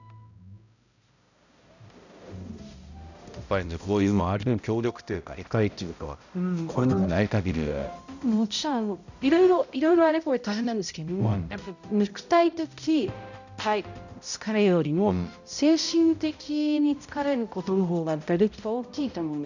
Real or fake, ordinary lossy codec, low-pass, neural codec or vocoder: fake; none; 7.2 kHz; codec, 16 kHz, 0.5 kbps, X-Codec, HuBERT features, trained on balanced general audio